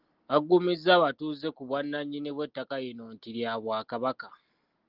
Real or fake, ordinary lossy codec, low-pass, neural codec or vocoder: real; Opus, 16 kbps; 5.4 kHz; none